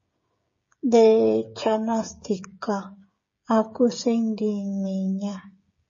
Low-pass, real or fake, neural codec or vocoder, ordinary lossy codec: 7.2 kHz; fake; codec, 16 kHz, 16 kbps, FreqCodec, smaller model; MP3, 32 kbps